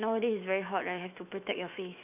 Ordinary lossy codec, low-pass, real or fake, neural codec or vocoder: none; 3.6 kHz; fake; autoencoder, 48 kHz, 128 numbers a frame, DAC-VAE, trained on Japanese speech